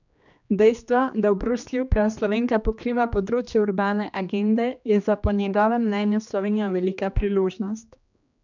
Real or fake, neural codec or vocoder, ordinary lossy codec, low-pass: fake; codec, 16 kHz, 2 kbps, X-Codec, HuBERT features, trained on general audio; none; 7.2 kHz